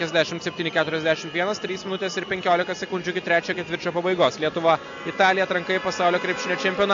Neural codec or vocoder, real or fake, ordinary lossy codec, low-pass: none; real; AAC, 64 kbps; 7.2 kHz